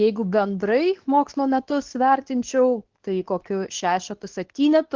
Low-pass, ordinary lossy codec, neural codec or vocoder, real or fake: 7.2 kHz; Opus, 16 kbps; codec, 24 kHz, 0.9 kbps, WavTokenizer, medium speech release version 2; fake